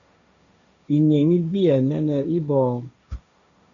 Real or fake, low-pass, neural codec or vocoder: fake; 7.2 kHz; codec, 16 kHz, 1.1 kbps, Voila-Tokenizer